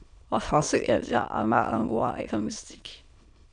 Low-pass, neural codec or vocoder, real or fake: 9.9 kHz; autoencoder, 22.05 kHz, a latent of 192 numbers a frame, VITS, trained on many speakers; fake